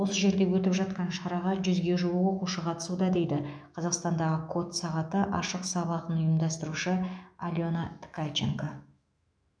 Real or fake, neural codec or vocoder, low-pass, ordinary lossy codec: fake; autoencoder, 48 kHz, 128 numbers a frame, DAC-VAE, trained on Japanese speech; 9.9 kHz; none